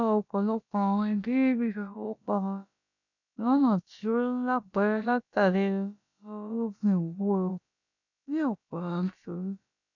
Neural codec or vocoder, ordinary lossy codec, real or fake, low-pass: codec, 16 kHz, about 1 kbps, DyCAST, with the encoder's durations; none; fake; 7.2 kHz